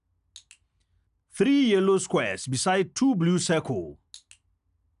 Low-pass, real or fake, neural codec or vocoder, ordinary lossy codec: 10.8 kHz; real; none; none